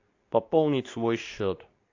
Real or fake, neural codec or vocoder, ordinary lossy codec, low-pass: fake; codec, 24 kHz, 0.9 kbps, WavTokenizer, medium speech release version 2; AAC, 48 kbps; 7.2 kHz